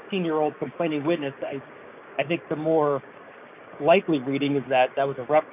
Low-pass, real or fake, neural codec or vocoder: 3.6 kHz; fake; codec, 16 kHz, 6 kbps, DAC